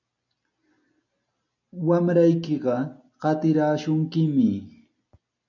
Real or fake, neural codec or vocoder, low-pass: real; none; 7.2 kHz